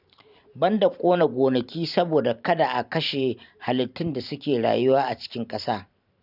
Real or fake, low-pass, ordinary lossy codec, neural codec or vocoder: fake; 5.4 kHz; none; vocoder, 44.1 kHz, 128 mel bands every 512 samples, BigVGAN v2